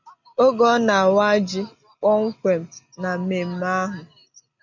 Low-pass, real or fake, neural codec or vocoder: 7.2 kHz; real; none